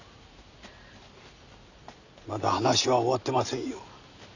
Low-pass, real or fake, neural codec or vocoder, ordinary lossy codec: 7.2 kHz; real; none; none